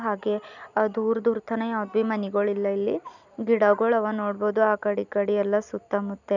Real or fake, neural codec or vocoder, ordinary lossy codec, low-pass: real; none; none; 7.2 kHz